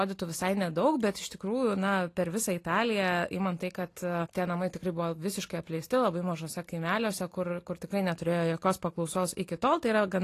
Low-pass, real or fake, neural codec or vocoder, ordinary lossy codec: 14.4 kHz; real; none; AAC, 48 kbps